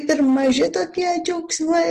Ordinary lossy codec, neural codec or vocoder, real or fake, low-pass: Opus, 16 kbps; none; real; 14.4 kHz